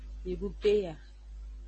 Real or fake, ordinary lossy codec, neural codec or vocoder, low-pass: real; MP3, 32 kbps; none; 10.8 kHz